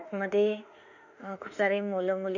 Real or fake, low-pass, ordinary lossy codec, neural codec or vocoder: fake; 7.2 kHz; none; autoencoder, 48 kHz, 32 numbers a frame, DAC-VAE, trained on Japanese speech